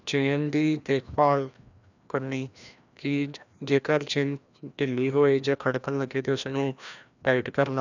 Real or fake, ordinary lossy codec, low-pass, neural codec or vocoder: fake; none; 7.2 kHz; codec, 16 kHz, 1 kbps, FreqCodec, larger model